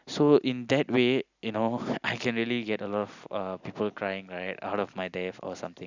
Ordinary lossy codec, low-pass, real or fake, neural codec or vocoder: none; 7.2 kHz; real; none